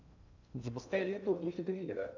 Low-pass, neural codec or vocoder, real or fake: 7.2 kHz; codec, 16 kHz in and 24 kHz out, 0.6 kbps, FocalCodec, streaming, 2048 codes; fake